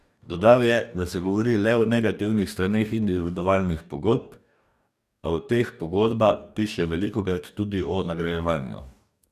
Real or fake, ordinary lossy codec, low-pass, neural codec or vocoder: fake; none; 14.4 kHz; codec, 44.1 kHz, 2.6 kbps, DAC